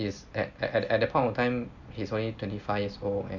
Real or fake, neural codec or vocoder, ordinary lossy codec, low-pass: real; none; none; 7.2 kHz